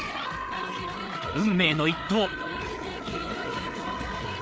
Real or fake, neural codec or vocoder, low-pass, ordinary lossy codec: fake; codec, 16 kHz, 8 kbps, FreqCodec, larger model; none; none